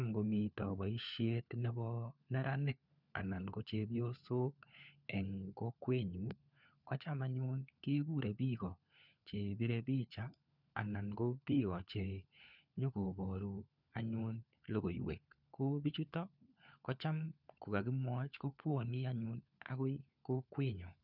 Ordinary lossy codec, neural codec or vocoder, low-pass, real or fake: none; codec, 16 kHz, 4 kbps, FunCodec, trained on LibriTTS, 50 frames a second; 5.4 kHz; fake